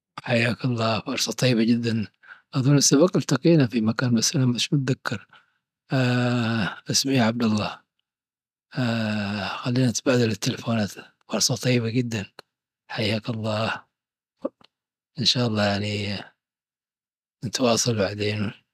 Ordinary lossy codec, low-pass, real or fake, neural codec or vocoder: none; 14.4 kHz; real; none